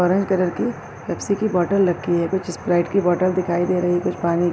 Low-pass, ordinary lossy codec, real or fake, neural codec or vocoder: none; none; real; none